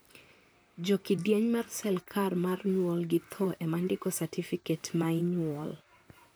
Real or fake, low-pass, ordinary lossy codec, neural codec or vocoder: fake; none; none; vocoder, 44.1 kHz, 128 mel bands, Pupu-Vocoder